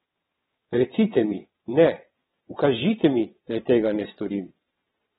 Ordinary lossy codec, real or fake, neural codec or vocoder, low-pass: AAC, 16 kbps; fake; vocoder, 22.05 kHz, 80 mel bands, WaveNeXt; 9.9 kHz